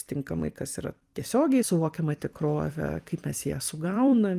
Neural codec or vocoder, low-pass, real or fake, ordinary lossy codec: vocoder, 44.1 kHz, 128 mel bands every 256 samples, BigVGAN v2; 14.4 kHz; fake; Opus, 32 kbps